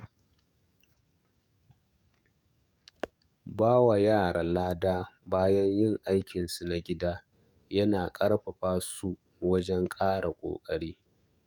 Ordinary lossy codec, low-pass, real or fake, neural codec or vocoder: none; 19.8 kHz; fake; codec, 44.1 kHz, 7.8 kbps, DAC